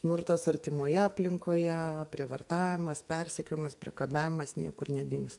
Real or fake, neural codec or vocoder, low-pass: fake; codec, 44.1 kHz, 2.6 kbps, SNAC; 10.8 kHz